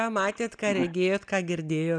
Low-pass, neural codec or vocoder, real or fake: 9.9 kHz; none; real